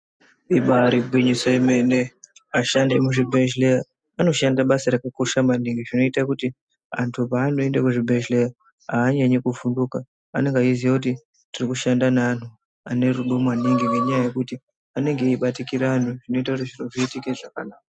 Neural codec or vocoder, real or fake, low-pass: none; real; 9.9 kHz